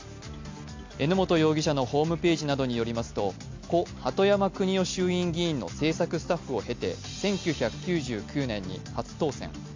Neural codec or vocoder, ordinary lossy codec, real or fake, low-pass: none; MP3, 48 kbps; real; 7.2 kHz